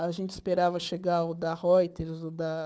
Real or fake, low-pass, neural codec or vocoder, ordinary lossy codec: fake; none; codec, 16 kHz, 4 kbps, FunCodec, trained on Chinese and English, 50 frames a second; none